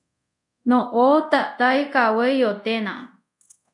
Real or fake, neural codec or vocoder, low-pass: fake; codec, 24 kHz, 0.5 kbps, DualCodec; 10.8 kHz